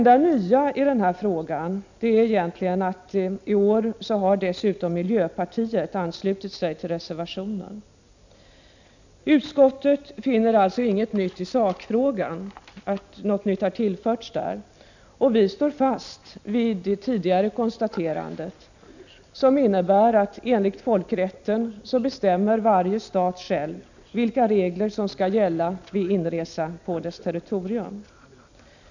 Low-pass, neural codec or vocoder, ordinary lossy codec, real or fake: 7.2 kHz; none; none; real